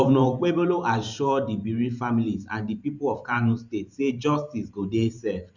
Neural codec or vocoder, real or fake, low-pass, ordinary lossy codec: vocoder, 44.1 kHz, 128 mel bands every 512 samples, BigVGAN v2; fake; 7.2 kHz; none